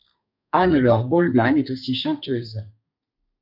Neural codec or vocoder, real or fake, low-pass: codec, 32 kHz, 1.9 kbps, SNAC; fake; 5.4 kHz